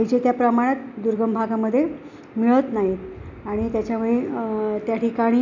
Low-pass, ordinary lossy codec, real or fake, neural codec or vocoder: 7.2 kHz; none; real; none